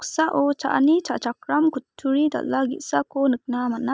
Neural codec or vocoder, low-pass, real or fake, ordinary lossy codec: none; none; real; none